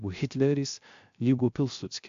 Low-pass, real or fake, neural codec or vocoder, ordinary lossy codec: 7.2 kHz; fake; codec, 16 kHz, 0.3 kbps, FocalCodec; AAC, 64 kbps